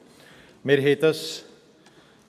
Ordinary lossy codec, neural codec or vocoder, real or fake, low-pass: none; none; real; 14.4 kHz